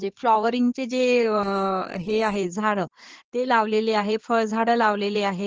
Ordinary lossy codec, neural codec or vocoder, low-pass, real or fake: Opus, 16 kbps; codec, 16 kHz in and 24 kHz out, 2.2 kbps, FireRedTTS-2 codec; 7.2 kHz; fake